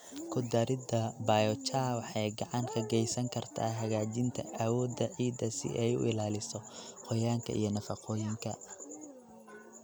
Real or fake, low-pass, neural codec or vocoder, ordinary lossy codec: real; none; none; none